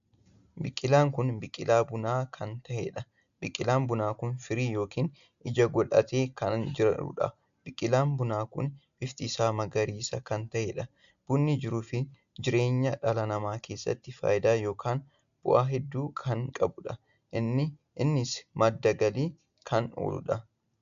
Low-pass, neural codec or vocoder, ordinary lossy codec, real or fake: 7.2 kHz; none; AAC, 96 kbps; real